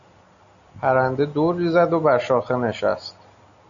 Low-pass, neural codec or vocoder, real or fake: 7.2 kHz; none; real